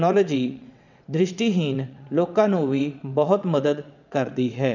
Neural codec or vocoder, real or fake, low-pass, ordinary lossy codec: vocoder, 22.05 kHz, 80 mel bands, WaveNeXt; fake; 7.2 kHz; none